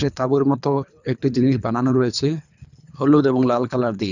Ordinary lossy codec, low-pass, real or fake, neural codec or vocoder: none; 7.2 kHz; fake; codec, 24 kHz, 3 kbps, HILCodec